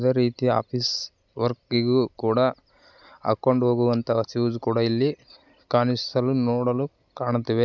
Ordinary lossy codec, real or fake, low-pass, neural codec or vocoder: none; real; 7.2 kHz; none